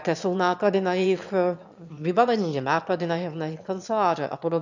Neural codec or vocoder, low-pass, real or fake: autoencoder, 22.05 kHz, a latent of 192 numbers a frame, VITS, trained on one speaker; 7.2 kHz; fake